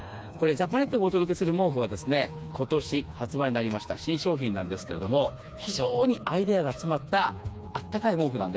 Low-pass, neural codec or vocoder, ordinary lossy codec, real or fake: none; codec, 16 kHz, 2 kbps, FreqCodec, smaller model; none; fake